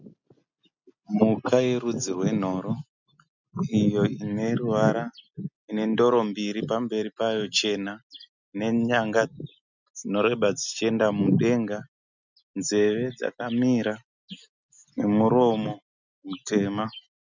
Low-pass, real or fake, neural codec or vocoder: 7.2 kHz; real; none